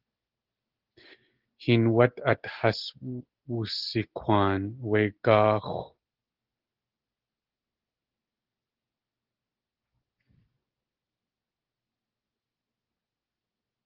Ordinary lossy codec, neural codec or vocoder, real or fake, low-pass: Opus, 16 kbps; none; real; 5.4 kHz